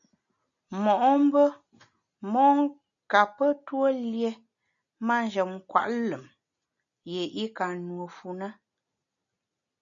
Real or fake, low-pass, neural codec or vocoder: real; 7.2 kHz; none